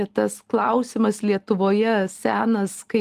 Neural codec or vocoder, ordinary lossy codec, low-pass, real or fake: none; Opus, 24 kbps; 14.4 kHz; real